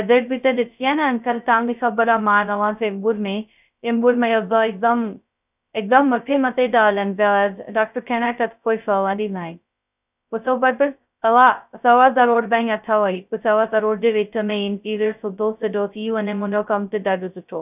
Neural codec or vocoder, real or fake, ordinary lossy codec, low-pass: codec, 16 kHz, 0.2 kbps, FocalCodec; fake; none; 3.6 kHz